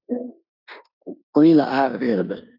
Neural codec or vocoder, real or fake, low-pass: codec, 16 kHz in and 24 kHz out, 0.9 kbps, LongCat-Audio-Codec, four codebook decoder; fake; 5.4 kHz